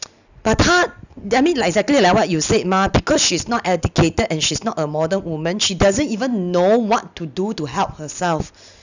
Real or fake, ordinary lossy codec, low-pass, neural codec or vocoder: real; none; 7.2 kHz; none